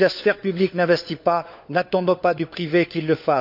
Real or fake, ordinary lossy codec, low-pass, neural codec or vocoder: fake; none; 5.4 kHz; codec, 16 kHz, 4 kbps, FunCodec, trained on LibriTTS, 50 frames a second